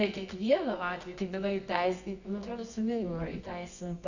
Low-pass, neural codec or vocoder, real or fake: 7.2 kHz; codec, 24 kHz, 0.9 kbps, WavTokenizer, medium music audio release; fake